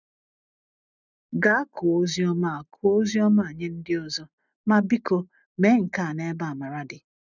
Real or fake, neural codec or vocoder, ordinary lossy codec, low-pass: real; none; none; 7.2 kHz